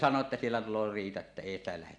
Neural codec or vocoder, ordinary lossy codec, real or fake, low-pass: none; none; real; 9.9 kHz